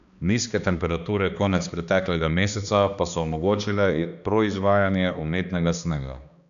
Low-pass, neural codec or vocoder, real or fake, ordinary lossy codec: 7.2 kHz; codec, 16 kHz, 2 kbps, X-Codec, HuBERT features, trained on balanced general audio; fake; none